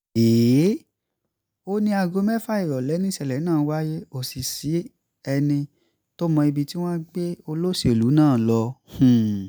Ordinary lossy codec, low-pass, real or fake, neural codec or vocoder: none; none; real; none